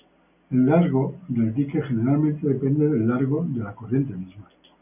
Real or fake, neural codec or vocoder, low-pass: real; none; 3.6 kHz